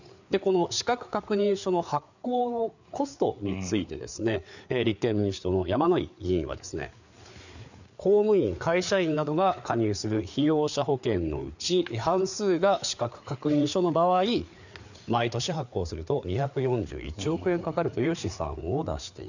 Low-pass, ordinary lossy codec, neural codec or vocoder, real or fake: 7.2 kHz; none; codec, 16 kHz, 4 kbps, FreqCodec, larger model; fake